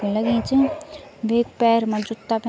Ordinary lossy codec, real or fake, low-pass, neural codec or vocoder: none; real; none; none